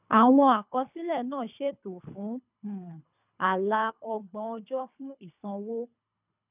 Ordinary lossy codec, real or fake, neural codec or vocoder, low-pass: none; fake; codec, 24 kHz, 3 kbps, HILCodec; 3.6 kHz